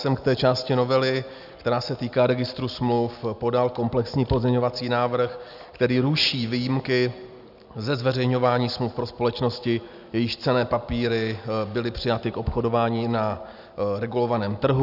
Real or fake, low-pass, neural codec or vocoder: real; 5.4 kHz; none